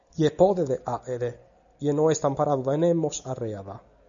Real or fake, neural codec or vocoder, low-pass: real; none; 7.2 kHz